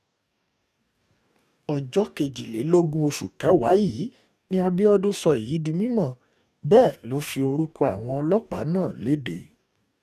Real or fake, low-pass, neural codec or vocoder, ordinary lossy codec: fake; 14.4 kHz; codec, 44.1 kHz, 2.6 kbps, DAC; none